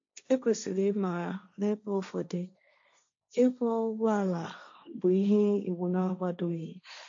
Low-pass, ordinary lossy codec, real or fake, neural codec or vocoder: 7.2 kHz; MP3, 48 kbps; fake; codec, 16 kHz, 1.1 kbps, Voila-Tokenizer